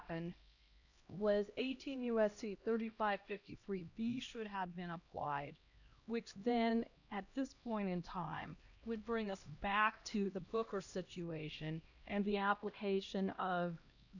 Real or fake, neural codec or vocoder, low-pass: fake; codec, 16 kHz, 1 kbps, X-Codec, HuBERT features, trained on LibriSpeech; 7.2 kHz